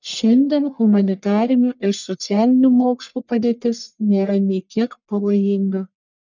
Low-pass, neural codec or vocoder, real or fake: 7.2 kHz; codec, 44.1 kHz, 1.7 kbps, Pupu-Codec; fake